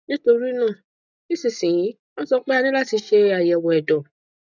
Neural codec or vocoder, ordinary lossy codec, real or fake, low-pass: none; none; real; 7.2 kHz